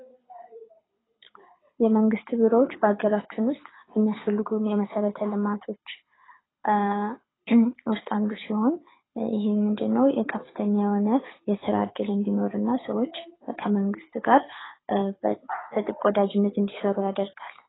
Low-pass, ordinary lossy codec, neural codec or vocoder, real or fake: 7.2 kHz; AAC, 16 kbps; codec, 24 kHz, 6 kbps, HILCodec; fake